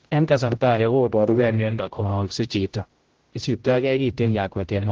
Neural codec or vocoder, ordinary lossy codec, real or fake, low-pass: codec, 16 kHz, 0.5 kbps, X-Codec, HuBERT features, trained on general audio; Opus, 16 kbps; fake; 7.2 kHz